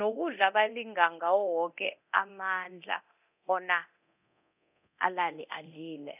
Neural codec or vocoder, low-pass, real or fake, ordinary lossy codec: codec, 24 kHz, 0.9 kbps, DualCodec; 3.6 kHz; fake; none